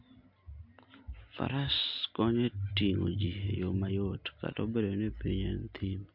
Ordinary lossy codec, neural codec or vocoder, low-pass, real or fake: MP3, 48 kbps; none; 5.4 kHz; real